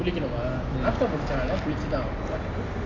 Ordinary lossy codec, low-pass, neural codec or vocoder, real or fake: AAC, 32 kbps; 7.2 kHz; none; real